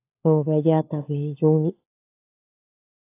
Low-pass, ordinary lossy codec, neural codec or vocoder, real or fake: 3.6 kHz; AAC, 24 kbps; codec, 16 kHz, 4 kbps, FunCodec, trained on LibriTTS, 50 frames a second; fake